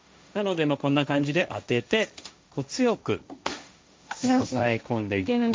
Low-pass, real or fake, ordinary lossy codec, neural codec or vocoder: none; fake; none; codec, 16 kHz, 1.1 kbps, Voila-Tokenizer